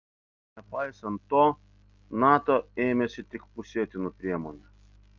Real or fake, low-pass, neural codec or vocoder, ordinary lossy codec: real; 7.2 kHz; none; Opus, 32 kbps